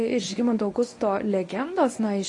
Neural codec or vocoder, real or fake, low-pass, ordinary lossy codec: codec, 24 kHz, 0.9 kbps, WavTokenizer, medium speech release version 1; fake; 10.8 kHz; AAC, 32 kbps